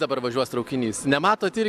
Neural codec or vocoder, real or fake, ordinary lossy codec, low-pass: none; real; AAC, 96 kbps; 14.4 kHz